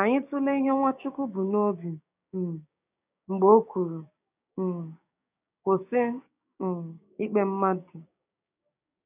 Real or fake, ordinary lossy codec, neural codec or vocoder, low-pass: fake; none; codec, 16 kHz, 6 kbps, DAC; 3.6 kHz